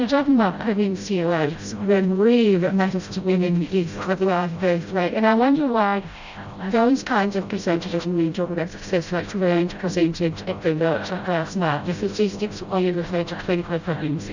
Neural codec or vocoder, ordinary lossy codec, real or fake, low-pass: codec, 16 kHz, 0.5 kbps, FreqCodec, smaller model; Opus, 64 kbps; fake; 7.2 kHz